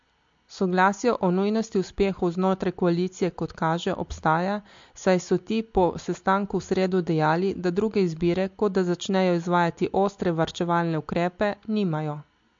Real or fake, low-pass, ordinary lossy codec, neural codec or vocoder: real; 7.2 kHz; MP3, 48 kbps; none